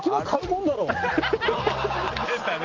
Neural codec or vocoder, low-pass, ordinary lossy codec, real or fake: none; 7.2 kHz; Opus, 32 kbps; real